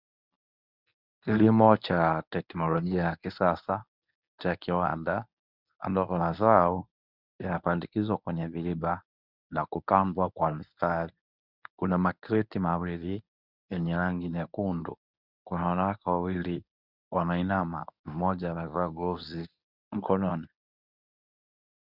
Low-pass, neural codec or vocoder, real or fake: 5.4 kHz; codec, 24 kHz, 0.9 kbps, WavTokenizer, medium speech release version 1; fake